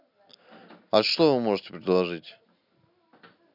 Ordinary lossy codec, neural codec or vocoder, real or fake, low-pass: none; none; real; 5.4 kHz